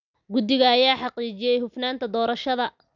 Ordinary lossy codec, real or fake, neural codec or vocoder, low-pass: none; real; none; 7.2 kHz